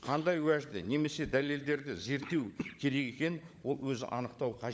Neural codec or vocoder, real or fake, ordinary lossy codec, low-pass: codec, 16 kHz, 16 kbps, FunCodec, trained on LibriTTS, 50 frames a second; fake; none; none